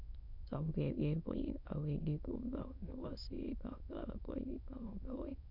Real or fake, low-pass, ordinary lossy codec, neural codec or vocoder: fake; 5.4 kHz; none; autoencoder, 22.05 kHz, a latent of 192 numbers a frame, VITS, trained on many speakers